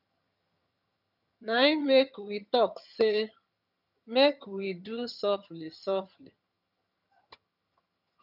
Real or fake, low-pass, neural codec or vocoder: fake; 5.4 kHz; vocoder, 22.05 kHz, 80 mel bands, HiFi-GAN